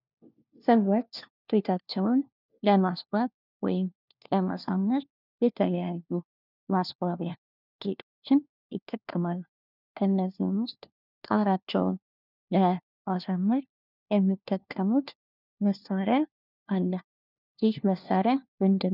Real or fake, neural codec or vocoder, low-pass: fake; codec, 16 kHz, 1 kbps, FunCodec, trained on LibriTTS, 50 frames a second; 5.4 kHz